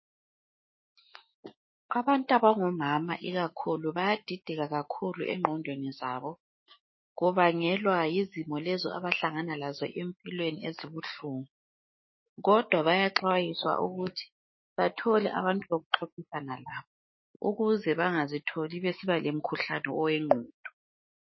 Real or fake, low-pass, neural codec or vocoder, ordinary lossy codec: fake; 7.2 kHz; autoencoder, 48 kHz, 128 numbers a frame, DAC-VAE, trained on Japanese speech; MP3, 24 kbps